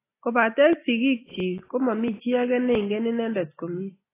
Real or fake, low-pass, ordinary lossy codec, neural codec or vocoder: real; 3.6 kHz; AAC, 16 kbps; none